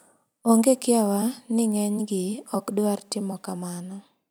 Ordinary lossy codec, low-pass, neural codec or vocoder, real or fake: none; none; vocoder, 44.1 kHz, 128 mel bands every 256 samples, BigVGAN v2; fake